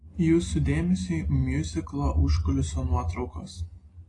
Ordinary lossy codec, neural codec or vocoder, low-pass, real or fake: AAC, 32 kbps; none; 10.8 kHz; real